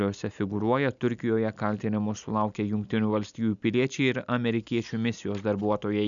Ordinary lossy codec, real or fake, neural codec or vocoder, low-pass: MP3, 96 kbps; real; none; 7.2 kHz